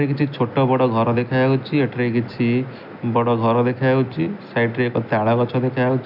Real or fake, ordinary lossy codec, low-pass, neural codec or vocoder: real; none; 5.4 kHz; none